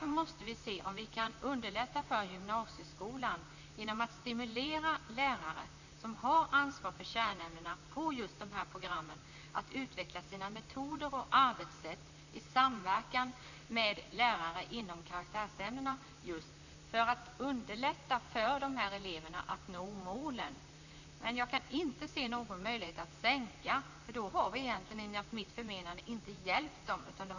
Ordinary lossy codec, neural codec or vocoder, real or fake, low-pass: none; vocoder, 44.1 kHz, 128 mel bands, Pupu-Vocoder; fake; 7.2 kHz